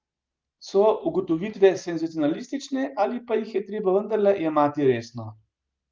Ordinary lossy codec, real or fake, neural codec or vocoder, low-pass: Opus, 32 kbps; real; none; 7.2 kHz